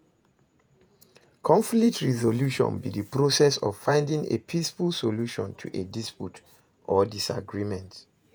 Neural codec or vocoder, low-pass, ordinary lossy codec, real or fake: vocoder, 48 kHz, 128 mel bands, Vocos; none; none; fake